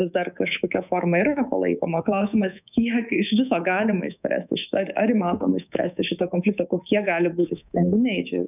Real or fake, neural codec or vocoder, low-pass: fake; codec, 24 kHz, 3.1 kbps, DualCodec; 3.6 kHz